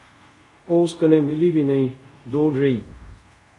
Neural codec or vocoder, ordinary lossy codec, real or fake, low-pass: codec, 24 kHz, 0.5 kbps, DualCodec; MP3, 48 kbps; fake; 10.8 kHz